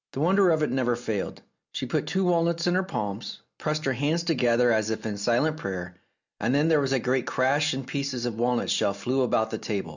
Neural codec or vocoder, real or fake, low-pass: none; real; 7.2 kHz